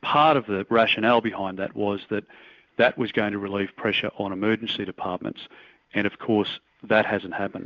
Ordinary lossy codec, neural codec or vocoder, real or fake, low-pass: MP3, 64 kbps; none; real; 7.2 kHz